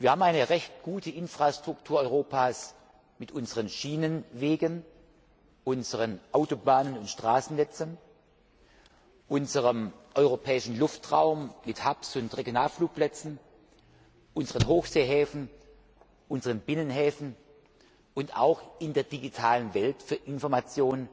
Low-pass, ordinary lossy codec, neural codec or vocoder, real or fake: none; none; none; real